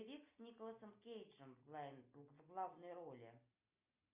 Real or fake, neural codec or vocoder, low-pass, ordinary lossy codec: real; none; 3.6 kHz; Opus, 64 kbps